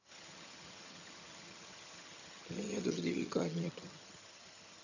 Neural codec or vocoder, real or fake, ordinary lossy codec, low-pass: vocoder, 22.05 kHz, 80 mel bands, HiFi-GAN; fake; none; 7.2 kHz